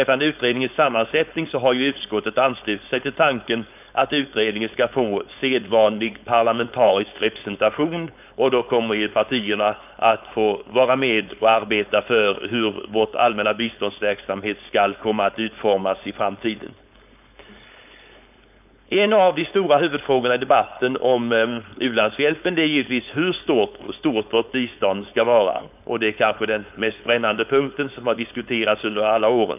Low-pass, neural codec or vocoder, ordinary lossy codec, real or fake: 3.6 kHz; codec, 16 kHz, 4.8 kbps, FACodec; none; fake